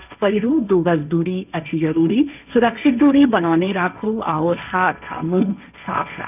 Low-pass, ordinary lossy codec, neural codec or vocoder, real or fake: 3.6 kHz; none; codec, 16 kHz, 1.1 kbps, Voila-Tokenizer; fake